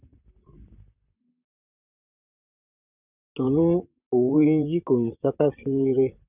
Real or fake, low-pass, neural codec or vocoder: fake; 3.6 kHz; vocoder, 44.1 kHz, 128 mel bands, Pupu-Vocoder